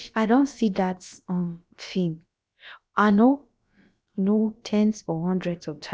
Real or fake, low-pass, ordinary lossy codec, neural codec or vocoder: fake; none; none; codec, 16 kHz, about 1 kbps, DyCAST, with the encoder's durations